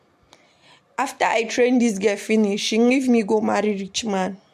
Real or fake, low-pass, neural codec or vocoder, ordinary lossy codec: real; 14.4 kHz; none; MP3, 64 kbps